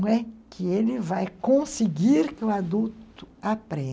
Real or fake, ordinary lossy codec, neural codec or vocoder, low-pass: real; none; none; none